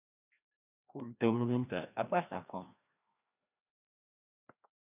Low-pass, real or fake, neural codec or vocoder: 3.6 kHz; fake; codec, 16 kHz, 1 kbps, FreqCodec, larger model